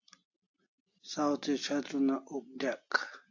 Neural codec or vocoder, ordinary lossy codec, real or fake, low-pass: none; AAC, 48 kbps; real; 7.2 kHz